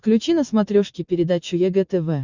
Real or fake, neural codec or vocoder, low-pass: real; none; 7.2 kHz